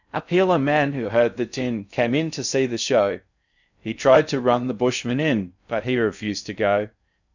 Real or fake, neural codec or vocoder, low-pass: fake; codec, 16 kHz in and 24 kHz out, 0.6 kbps, FocalCodec, streaming, 4096 codes; 7.2 kHz